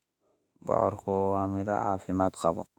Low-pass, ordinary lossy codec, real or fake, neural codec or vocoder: 19.8 kHz; none; fake; autoencoder, 48 kHz, 32 numbers a frame, DAC-VAE, trained on Japanese speech